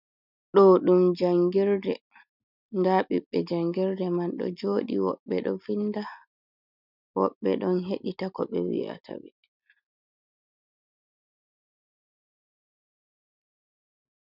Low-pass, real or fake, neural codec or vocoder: 5.4 kHz; real; none